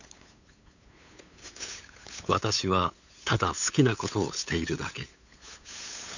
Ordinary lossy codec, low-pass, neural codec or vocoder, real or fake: none; 7.2 kHz; codec, 16 kHz, 8 kbps, FunCodec, trained on LibriTTS, 25 frames a second; fake